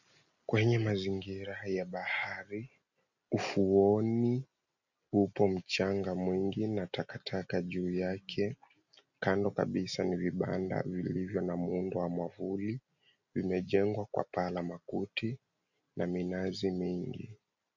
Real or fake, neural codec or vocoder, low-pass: real; none; 7.2 kHz